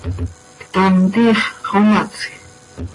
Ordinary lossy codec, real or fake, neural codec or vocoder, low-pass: AAC, 32 kbps; real; none; 10.8 kHz